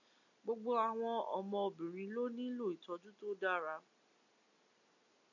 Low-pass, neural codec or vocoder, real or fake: 7.2 kHz; none; real